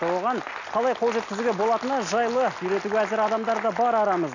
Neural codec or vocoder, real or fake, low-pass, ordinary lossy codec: none; real; 7.2 kHz; none